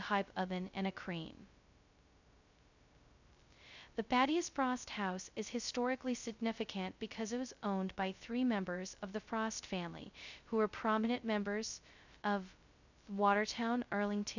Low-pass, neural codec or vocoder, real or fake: 7.2 kHz; codec, 16 kHz, 0.2 kbps, FocalCodec; fake